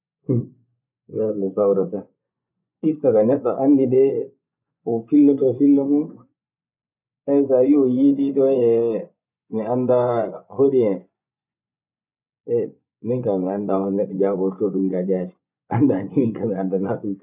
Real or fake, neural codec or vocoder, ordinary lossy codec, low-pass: fake; vocoder, 24 kHz, 100 mel bands, Vocos; none; 3.6 kHz